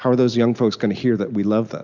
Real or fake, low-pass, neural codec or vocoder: real; 7.2 kHz; none